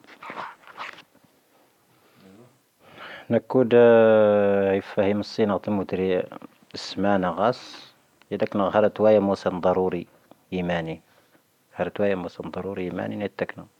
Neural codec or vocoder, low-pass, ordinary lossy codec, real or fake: none; 19.8 kHz; none; real